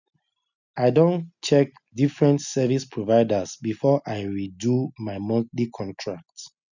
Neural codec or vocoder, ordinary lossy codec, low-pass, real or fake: none; none; 7.2 kHz; real